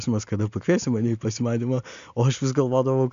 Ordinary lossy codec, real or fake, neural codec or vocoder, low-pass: MP3, 64 kbps; real; none; 7.2 kHz